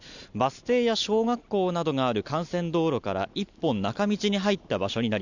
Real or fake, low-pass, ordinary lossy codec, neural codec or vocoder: real; 7.2 kHz; none; none